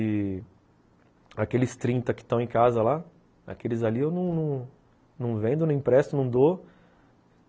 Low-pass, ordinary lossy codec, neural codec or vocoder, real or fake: none; none; none; real